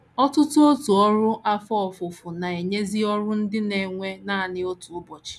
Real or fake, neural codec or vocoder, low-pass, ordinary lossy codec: real; none; none; none